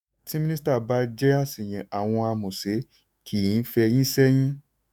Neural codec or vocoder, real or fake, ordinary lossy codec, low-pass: autoencoder, 48 kHz, 128 numbers a frame, DAC-VAE, trained on Japanese speech; fake; none; none